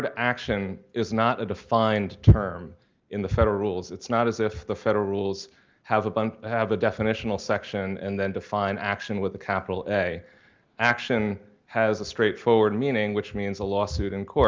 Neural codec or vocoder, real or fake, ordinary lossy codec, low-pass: none; real; Opus, 32 kbps; 7.2 kHz